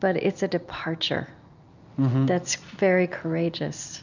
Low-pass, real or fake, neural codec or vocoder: 7.2 kHz; real; none